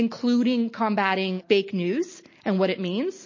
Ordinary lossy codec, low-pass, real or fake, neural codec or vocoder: MP3, 32 kbps; 7.2 kHz; real; none